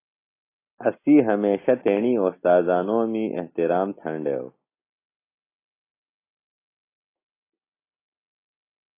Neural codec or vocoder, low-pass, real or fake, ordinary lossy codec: none; 3.6 kHz; real; AAC, 24 kbps